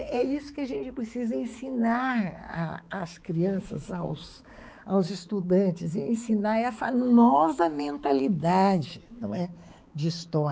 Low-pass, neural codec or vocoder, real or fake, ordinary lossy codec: none; codec, 16 kHz, 4 kbps, X-Codec, HuBERT features, trained on balanced general audio; fake; none